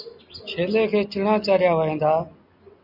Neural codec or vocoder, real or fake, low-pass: none; real; 5.4 kHz